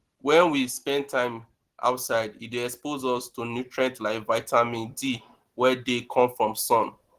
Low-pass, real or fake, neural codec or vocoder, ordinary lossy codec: 14.4 kHz; real; none; Opus, 16 kbps